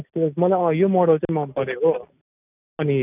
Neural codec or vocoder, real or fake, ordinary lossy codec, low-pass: none; real; none; 3.6 kHz